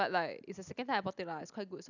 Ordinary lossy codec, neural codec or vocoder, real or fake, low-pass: none; none; real; 7.2 kHz